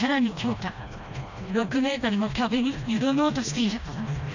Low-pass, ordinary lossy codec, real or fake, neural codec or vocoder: 7.2 kHz; none; fake; codec, 16 kHz, 1 kbps, FreqCodec, smaller model